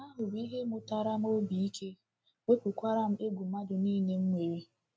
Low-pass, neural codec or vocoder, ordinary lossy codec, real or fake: none; none; none; real